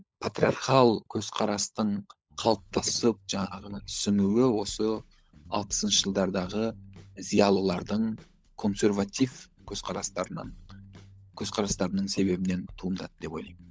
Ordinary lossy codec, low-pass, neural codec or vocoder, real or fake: none; none; codec, 16 kHz, 16 kbps, FunCodec, trained on LibriTTS, 50 frames a second; fake